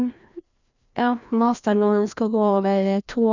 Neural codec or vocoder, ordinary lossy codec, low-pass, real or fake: codec, 16 kHz, 1 kbps, FreqCodec, larger model; none; 7.2 kHz; fake